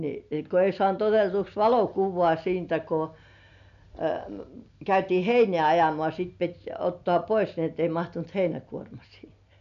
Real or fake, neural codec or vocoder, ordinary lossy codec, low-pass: real; none; AAC, 96 kbps; 7.2 kHz